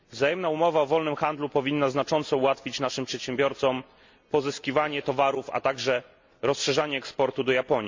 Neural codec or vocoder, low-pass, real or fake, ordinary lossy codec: none; 7.2 kHz; real; MP3, 64 kbps